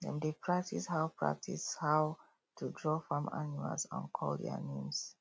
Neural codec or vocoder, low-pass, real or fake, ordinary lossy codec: none; none; real; none